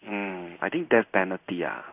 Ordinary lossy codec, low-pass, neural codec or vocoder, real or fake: none; 3.6 kHz; codec, 16 kHz in and 24 kHz out, 1 kbps, XY-Tokenizer; fake